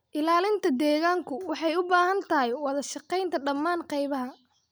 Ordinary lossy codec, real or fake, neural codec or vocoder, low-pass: none; real; none; none